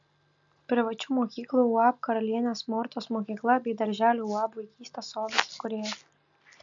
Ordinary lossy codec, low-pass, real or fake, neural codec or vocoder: MP3, 64 kbps; 7.2 kHz; real; none